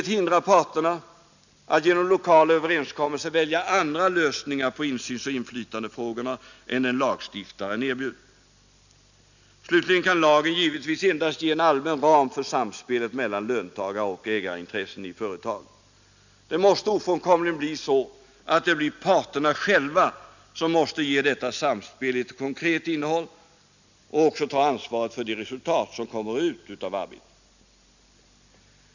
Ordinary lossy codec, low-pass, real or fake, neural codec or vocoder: none; 7.2 kHz; real; none